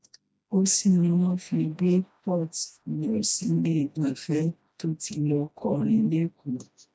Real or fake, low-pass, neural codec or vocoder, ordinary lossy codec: fake; none; codec, 16 kHz, 1 kbps, FreqCodec, smaller model; none